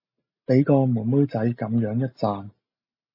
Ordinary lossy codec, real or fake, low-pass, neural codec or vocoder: MP3, 24 kbps; real; 5.4 kHz; none